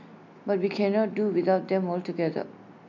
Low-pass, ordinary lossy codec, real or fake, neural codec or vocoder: 7.2 kHz; MP3, 64 kbps; real; none